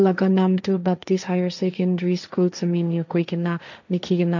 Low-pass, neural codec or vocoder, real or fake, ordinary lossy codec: 7.2 kHz; codec, 16 kHz, 1.1 kbps, Voila-Tokenizer; fake; none